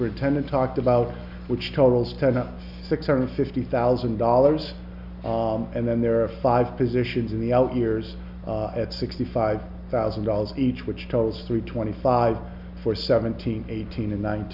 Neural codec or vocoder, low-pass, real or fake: none; 5.4 kHz; real